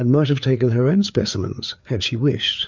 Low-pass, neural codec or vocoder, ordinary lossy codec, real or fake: 7.2 kHz; codec, 16 kHz, 4 kbps, FreqCodec, larger model; MP3, 64 kbps; fake